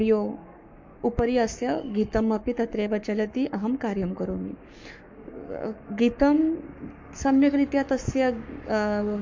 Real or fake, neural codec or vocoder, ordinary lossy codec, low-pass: fake; codec, 44.1 kHz, 7.8 kbps, Pupu-Codec; MP3, 48 kbps; 7.2 kHz